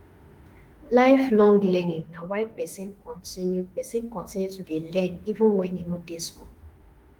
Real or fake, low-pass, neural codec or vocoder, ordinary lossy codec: fake; 19.8 kHz; autoencoder, 48 kHz, 32 numbers a frame, DAC-VAE, trained on Japanese speech; Opus, 24 kbps